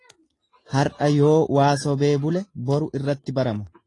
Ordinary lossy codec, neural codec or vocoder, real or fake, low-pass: AAC, 32 kbps; none; real; 10.8 kHz